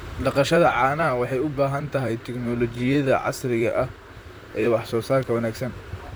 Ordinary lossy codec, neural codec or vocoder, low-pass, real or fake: none; vocoder, 44.1 kHz, 128 mel bands, Pupu-Vocoder; none; fake